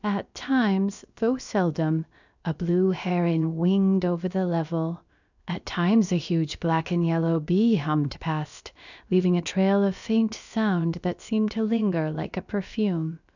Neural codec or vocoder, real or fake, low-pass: codec, 16 kHz, about 1 kbps, DyCAST, with the encoder's durations; fake; 7.2 kHz